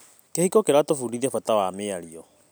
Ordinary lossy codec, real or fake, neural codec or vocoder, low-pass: none; real; none; none